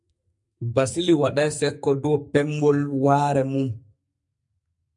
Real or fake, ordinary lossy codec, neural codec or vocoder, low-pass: fake; MP3, 64 kbps; codec, 44.1 kHz, 2.6 kbps, SNAC; 10.8 kHz